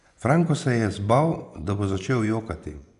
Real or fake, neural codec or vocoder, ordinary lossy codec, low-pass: fake; vocoder, 24 kHz, 100 mel bands, Vocos; none; 10.8 kHz